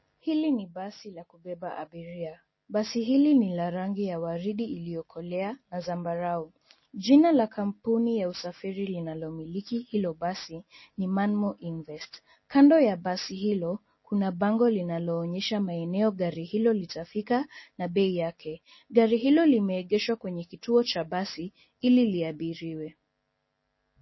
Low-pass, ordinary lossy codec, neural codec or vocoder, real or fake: 7.2 kHz; MP3, 24 kbps; none; real